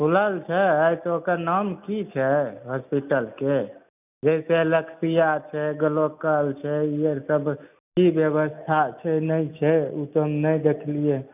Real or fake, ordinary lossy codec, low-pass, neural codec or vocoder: real; none; 3.6 kHz; none